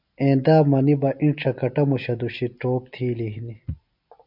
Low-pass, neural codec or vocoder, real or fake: 5.4 kHz; none; real